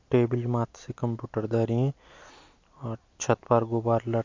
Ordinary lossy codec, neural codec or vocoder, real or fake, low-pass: MP3, 48 kbps; none; real; 7.2 kHz